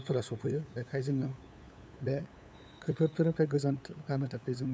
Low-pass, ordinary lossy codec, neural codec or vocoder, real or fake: none; none; codec, 16 kHz, 8 kbps, FunCodec, trained on LibriTTS, 25 frames a second; fake